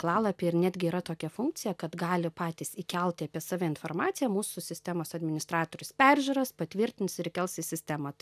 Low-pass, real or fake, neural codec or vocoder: 14.4 kHz; real; none